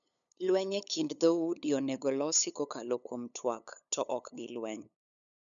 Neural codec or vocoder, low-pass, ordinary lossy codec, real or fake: codec, 16 kHz, 8 kbps, FunCodec, trained on LibriTTS, 25 frames a second; 7.2 kHz; none; fake